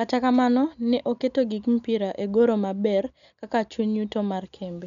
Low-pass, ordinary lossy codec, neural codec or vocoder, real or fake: 7.2 kHz; none; none; real